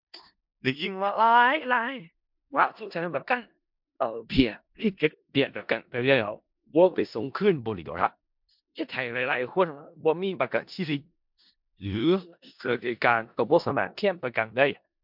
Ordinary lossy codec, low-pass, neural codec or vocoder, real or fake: MP3, 48 kbps; 5.4 kHz; codec, 16 kHz in and 24 kHz out, 0.4 kbps, LongCat-Audio-Codec, four codebook decoder; fake